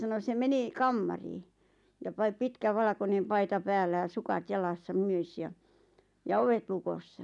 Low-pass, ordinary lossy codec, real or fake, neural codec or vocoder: 10.8 kHz; none; real; none